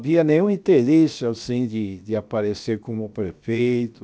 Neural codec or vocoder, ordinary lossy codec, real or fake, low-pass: codec, 16 kHz, 0.7 kbps, FocalCodec; none; fake; none